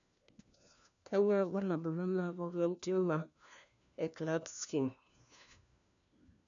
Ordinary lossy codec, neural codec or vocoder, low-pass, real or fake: none; codec, 16 kHz, 1 kbps, FunCodec, trained on LibriTTS, 50 frames a second; 7.2 kHz; fake